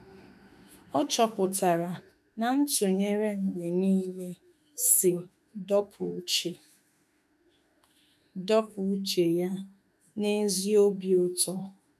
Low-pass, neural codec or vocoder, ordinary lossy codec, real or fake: 14.4 kHz; autoencoder, 48 kHz, 32 numbers a frame, DAC-VAE, trained on Japanese speech; none; fake